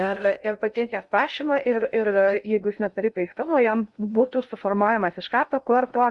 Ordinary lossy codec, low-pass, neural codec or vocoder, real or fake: Opus, 64 kbps; 10.8 kHz; codec, 16 kHz in and 24 kHz out, 0.6 kbps, FocalCodec, streaming, 2048 codes; fake